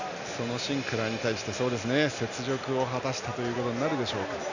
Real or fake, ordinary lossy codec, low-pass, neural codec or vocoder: real; none; 7.2 kHz; none